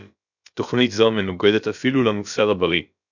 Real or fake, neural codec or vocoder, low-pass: fake; codec, 16 kHz, about 1 kbps, DyCAST, with the encoder's durations; 7.2 kHz